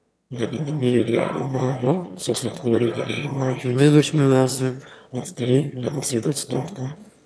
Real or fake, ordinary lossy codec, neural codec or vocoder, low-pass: fake; none; autoencoder, 22.05 kHz, a latent of 192 numbers a frame, VITS, trained on one speaker; none